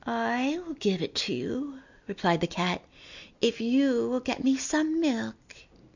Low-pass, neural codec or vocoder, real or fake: 7.2 kHz; none; real